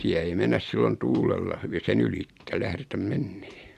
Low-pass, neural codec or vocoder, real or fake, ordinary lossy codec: 14.4 kHz; none; real; Opus, 64 kbps